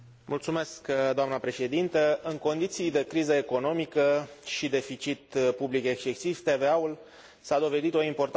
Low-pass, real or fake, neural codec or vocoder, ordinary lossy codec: none; real; none; none